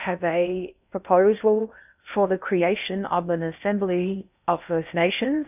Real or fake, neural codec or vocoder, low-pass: fake; codec, 16 kHz in and 24 kHz out, 0.6 kbps, FocalCodec, streaming, 2048 codes; 3.6 kHz